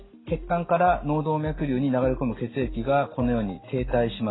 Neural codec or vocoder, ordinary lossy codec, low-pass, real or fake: none; AAC, 16 kbps; 7.2 kHz; real